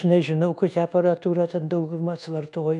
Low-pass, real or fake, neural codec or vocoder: 10.8 kHz; fake; codec, 24 kHz, 1.2 kbps, DualCodec